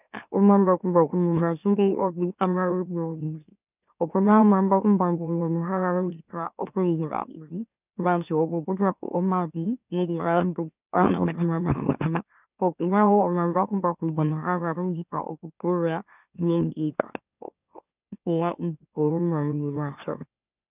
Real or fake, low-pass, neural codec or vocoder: fake; 3.6 kHz; autoencoder, 44.1 kHz, a latent of 192 numbers a frame, MeloTTS